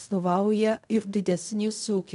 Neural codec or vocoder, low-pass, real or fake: codec, 16 kHz in and 24 kHz out, 0.4 kbps, LongCat-Audio-Codec, fine tuned four codebook decoder; 10.8 kHz; fake